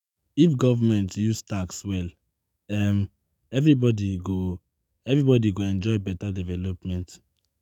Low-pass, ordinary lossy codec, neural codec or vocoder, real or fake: 19.8 kHz; none; codec, 44.1 kHz, 7.8 kbps, DAC; fake